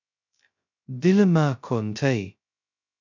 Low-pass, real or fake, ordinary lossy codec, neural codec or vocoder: 7.2 kHz; fake; MP3, 64 kbps; codec, 16 kHz, 0.2 kbps, FocalCodec